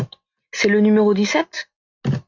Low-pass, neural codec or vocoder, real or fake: 7.2 kHz; none; real